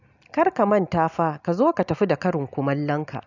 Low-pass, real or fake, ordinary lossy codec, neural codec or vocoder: 7.2 kHz; real; none; none